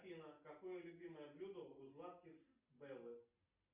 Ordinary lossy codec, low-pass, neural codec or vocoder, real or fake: Opus, 64 kbps; 3.6 kHz; none; real